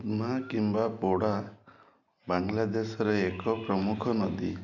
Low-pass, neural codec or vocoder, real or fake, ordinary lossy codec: 7.2 kHz; none; real; MP3, 64 kbps